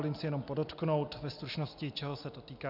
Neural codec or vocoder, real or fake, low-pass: none; real; 5.4 kHz